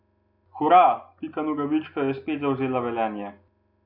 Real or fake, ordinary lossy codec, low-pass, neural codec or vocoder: real; none; 5.4 kHz; none